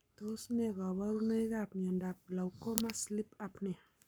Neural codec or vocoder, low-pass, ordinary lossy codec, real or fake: codec, 44.1 kHz, 7.8 kbps, Pupu-Codec; none; none; fake